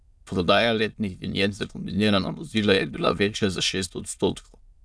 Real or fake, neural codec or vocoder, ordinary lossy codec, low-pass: fake; autoencoder, 22.05 kHz, a latent of 192 numbers a frame, VITS, trained on many speakers; none; none